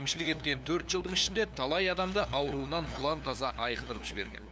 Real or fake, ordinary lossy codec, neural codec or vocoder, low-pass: fake; none; codec, 16 kHz, 2 kbps, FunCodec, trained on LibriTTS, 25 frames a second; none